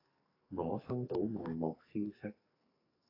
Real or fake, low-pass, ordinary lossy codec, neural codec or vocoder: fake; 5.4 kHz; AAC, 24 kbps; codec, 44.1 kHz, 2.6 kbps, SNAC